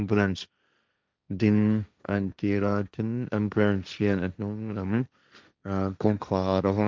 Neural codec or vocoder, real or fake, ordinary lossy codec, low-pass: codec, 16 kHz, 1.1 kbps, Voila-Tokenizer; fake; none; none